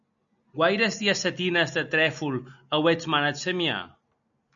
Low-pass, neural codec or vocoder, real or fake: 7.2 kHz; none; real